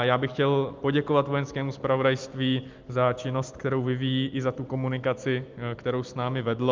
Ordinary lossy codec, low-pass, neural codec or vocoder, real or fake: Opus, 24 kbps; 7.2 kHz; none; real